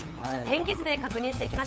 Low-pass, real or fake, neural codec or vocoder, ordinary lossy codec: none; fake; codec, 16 kHz, 8 kbps, FunCodec, trained on LibriTTS, 25 frames a second; none